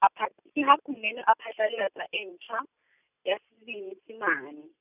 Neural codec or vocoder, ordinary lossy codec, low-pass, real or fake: none; none; 3.6 kHz; real